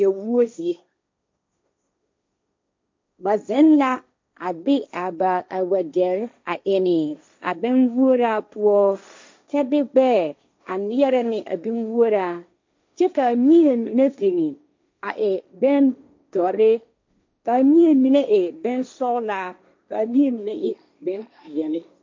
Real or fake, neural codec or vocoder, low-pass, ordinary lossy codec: fake; codec, 16 kHz, 1.1 kbps, Voila-Tokenizer; 7.2 kHz; MP3, 64 kbps